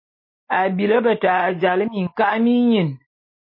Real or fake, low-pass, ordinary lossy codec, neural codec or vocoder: real; 5.4 kHz; MP3, 24 kbps; none